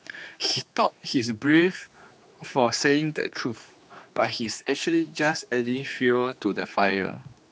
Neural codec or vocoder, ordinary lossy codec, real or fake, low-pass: codec, 16 kHz, 2 kbps, X-Codec, HuBERT features, trained on general audio; none; fake; none